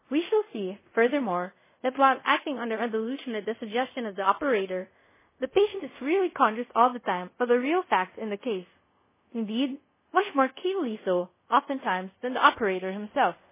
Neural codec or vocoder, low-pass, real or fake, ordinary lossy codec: codec, 24 kHz, 0.5 kbps, DualCodec; 3.6 kHz; fake; MP3, 16 kbps